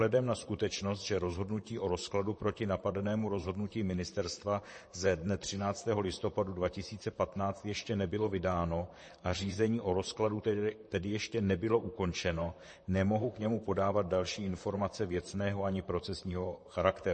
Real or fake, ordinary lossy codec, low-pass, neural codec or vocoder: fake; MP3, 32 kbps; 10.8 kHz; vocoder, 44.1 kHz, 128 mel bands, Pupu-Vocoder